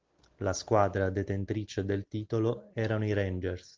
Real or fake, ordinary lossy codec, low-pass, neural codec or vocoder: real; Opus, 32 kbps; 7.2 kHz; none